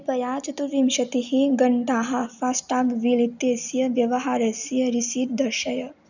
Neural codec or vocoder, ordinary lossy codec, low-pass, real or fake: none; none; 7.2 kHz; real